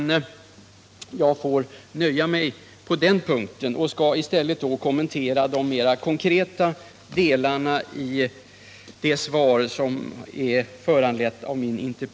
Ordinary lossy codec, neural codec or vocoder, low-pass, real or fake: none; none; none; real